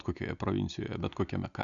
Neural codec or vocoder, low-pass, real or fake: none; 7.2 kHz; real